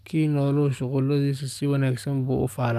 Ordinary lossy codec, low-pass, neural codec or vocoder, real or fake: none; 14.4 kHz; codec, 44.1 kHz, 7.8 kbps, DAC; fake